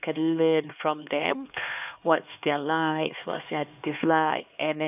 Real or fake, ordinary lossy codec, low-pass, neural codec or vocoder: fake; none; 3.6 kHz; codec, 16 kHz, 2 kbps, X-Codec, HuBERT features, trained on LibriSpeech